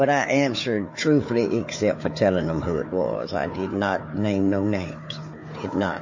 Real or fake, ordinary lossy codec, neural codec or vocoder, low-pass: fake; MP3, 32 kbps; codec, 16 kHz, 4 kbps, FunCodec, trained on Chinese and English, 50 frames a second; 7.2 kHz